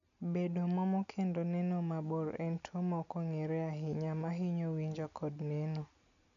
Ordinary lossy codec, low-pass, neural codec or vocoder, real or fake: none; 7.2 kHz; none; real